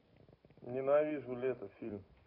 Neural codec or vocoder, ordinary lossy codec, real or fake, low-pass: none; AAC, 32 kbps; real; 5.4 kHz